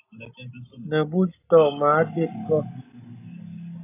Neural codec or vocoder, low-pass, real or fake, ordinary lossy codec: none; 3.6 kHz; real; AAC, 24 kbps